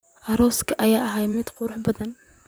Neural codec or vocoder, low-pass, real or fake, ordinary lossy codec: vocoder, 44.1 kHz, 128 mel bands, Pupu-Vocoder; none; fake; none